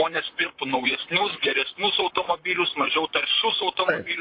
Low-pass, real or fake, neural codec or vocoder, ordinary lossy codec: 5.4 kHz; fake; vocoder, 44.1 kHz, 128 mel bands, Pupu-Vocoder; MP3, 32 kbps